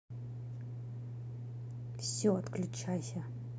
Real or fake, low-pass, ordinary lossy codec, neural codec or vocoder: real; none; none; none